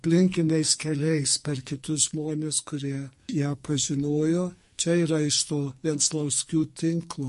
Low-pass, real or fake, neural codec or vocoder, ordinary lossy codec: 14.4 kHz; fake; codec, 44.1 kHz, 2.6 kbps, SNAC; MP3, 48 kbps